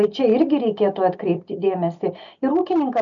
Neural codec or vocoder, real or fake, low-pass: none; real; 7.2 kHz